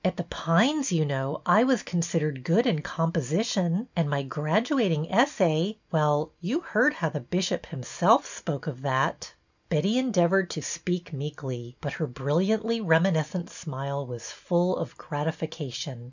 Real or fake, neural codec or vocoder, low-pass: real; none; 7.2 kHz